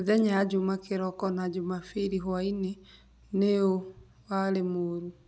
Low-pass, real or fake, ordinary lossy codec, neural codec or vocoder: none; real; none; none